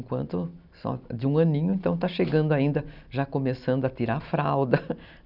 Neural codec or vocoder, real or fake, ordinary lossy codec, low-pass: none; real; none; 5.4 kHz